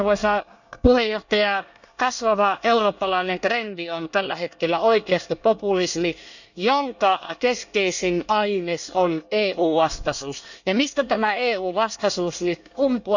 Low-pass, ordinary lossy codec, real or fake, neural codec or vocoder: 7.2 kHz; none; fake; codec, 24 kHz, 1 kbps, SNAC